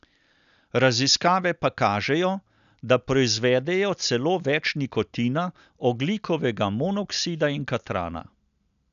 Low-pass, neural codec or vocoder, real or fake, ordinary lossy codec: 7.2 kHz; none; real; none